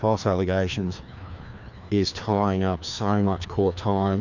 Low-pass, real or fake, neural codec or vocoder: 7.2 kHz; fake; codec, 16 kHz, 2 kbps, FreqCodec, larger model